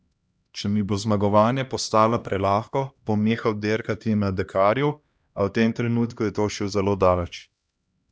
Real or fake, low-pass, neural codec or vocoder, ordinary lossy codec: fake; none; codec, 16 kHz, 1 kbps, X-Codec, HuBERT features, trained on LibriSpeech; none